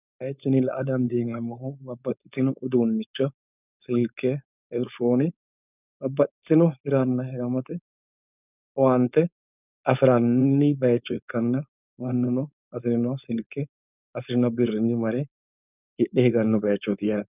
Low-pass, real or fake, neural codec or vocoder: 3.6 kHz; fake; codec, 16 kHz, 4.8 kbps, FACodec